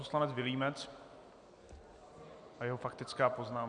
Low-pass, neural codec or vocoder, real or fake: 9.9 kHz; none; real